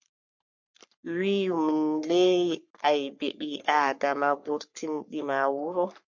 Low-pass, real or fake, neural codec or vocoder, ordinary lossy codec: 7.2 kHz; fake; codec, 44.1 kHz, 3.4 kbps, Pupu-Codec; MP3, 48 kbps